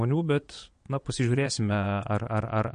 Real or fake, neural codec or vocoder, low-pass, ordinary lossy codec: fake; vocoder, 22.05 kHz, 80 mel bands, Vocos; 9.9 kHz; MP3, 48 kbps